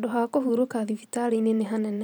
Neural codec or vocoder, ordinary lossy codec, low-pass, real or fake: vocoder, 44.1 kHz, 128 mel bands every 512 samples, BigVGAN v2; none; none; fake